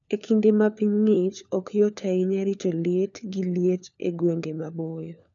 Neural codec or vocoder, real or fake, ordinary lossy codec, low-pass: codec, 16 kHz, 4 kbps, FunCodec, trained on LibriTTS, 50 frames a second; fake; none; 7.2 kHz